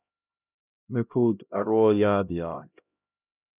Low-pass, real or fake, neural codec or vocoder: 3.6 kHz; fake; codec, 16 kHz, 0.5 kbps, X-Codec, HuBERT features, trained on LibriSpeech